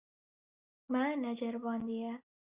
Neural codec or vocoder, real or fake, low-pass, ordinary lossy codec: none; real; 3.6 kHz; Opus, 64 kbps